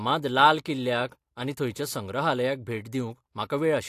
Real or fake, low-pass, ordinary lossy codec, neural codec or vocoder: real; 14.4 kHz; AAC, 64 kbps; none